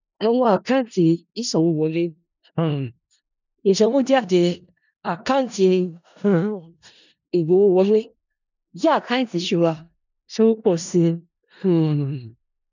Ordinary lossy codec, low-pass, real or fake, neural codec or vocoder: none; 7.2 kHz; fake; codec, 16 kHz in and 24 kHz out, 0.4 kbps, LongCat-Audio-Codec, four codebook decoder